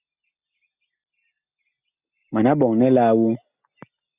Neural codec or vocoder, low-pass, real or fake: none; 3.6 kHz; real